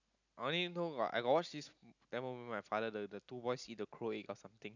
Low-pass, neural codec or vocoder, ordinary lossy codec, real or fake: 7.2 kHz; none; none; real